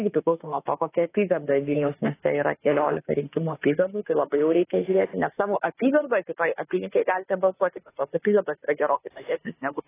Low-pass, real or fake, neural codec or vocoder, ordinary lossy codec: 3.6 kHz; fake; autoencoder, 48 kHz, 32 numbers a frame, DAC-VAE, trained on Japanese speech; AAC, 16 kbps